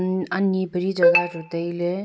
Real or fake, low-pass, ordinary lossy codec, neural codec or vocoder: real; none; none; none